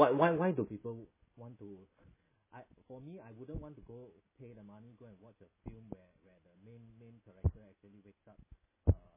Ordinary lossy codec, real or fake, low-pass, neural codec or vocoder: MP3, 16 kbps; real; 3.6 kHz; none